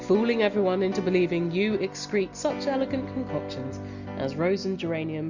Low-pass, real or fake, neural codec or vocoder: 7.2 kHz; real; none